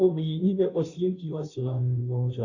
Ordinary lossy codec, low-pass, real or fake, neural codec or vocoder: AAC, 48 kbps; 7.2 kHz; fake; codec, 16 kHz, 0.5 kbps, FunCodec, trained on Chinese and English, 25 frames a second